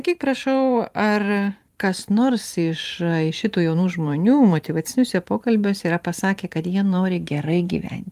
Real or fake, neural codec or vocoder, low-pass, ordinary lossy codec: fake; vocoder, 44.1 kHz, 128 mel bands every 512 samples, BigVGAN v2; 14.4 kHz; Opus, 32 kbps